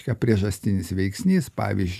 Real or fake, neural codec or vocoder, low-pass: real; none; 14.4 kHz